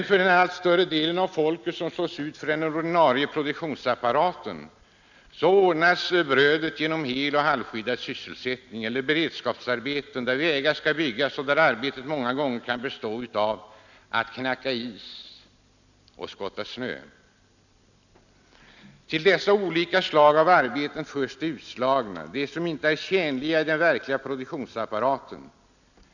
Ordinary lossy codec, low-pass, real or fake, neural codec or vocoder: none; 7.2 kHz; real; none